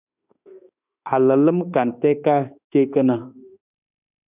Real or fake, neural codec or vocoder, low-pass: fake; autoencoder, 48 kHz, 32 numbers a frame, DAC-VAE, trained on Japanese speech; 3.6 kHz